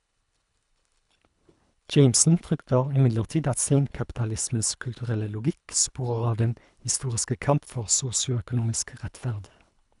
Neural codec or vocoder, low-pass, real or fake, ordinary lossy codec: codec, 24 kHz, 3 kbps, HILCodec; 10.8 kHz; fake; none